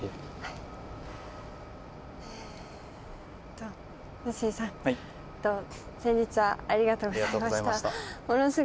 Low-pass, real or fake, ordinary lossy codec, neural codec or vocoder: none; real; none; none